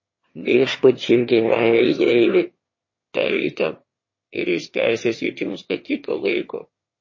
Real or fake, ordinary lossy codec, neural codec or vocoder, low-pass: fake; MP3, 32 kbps; autoencoder, 22.05 kHz, a latent of 192 numbers a frame, VITS, trained on one speaker; 7.2 kHz